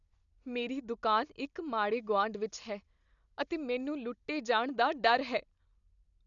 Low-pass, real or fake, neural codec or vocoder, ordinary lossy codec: 7.2 kHz; real; none; none